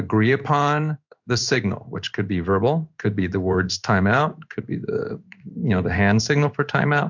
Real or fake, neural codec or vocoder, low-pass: fake; codec, 16 kHz in and 24 kHz out, 1 kbps, XY-Tokenizer; 7.2 kHz